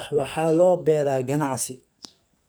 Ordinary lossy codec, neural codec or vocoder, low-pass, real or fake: none; codec, 44.1 kHz, 2.6 kbps, SNAC; none; fake